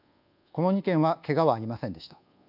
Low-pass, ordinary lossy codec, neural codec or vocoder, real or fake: 5.4 kHz; none; codec, 24 kHz, 1.2 kbps, DualCodec; fake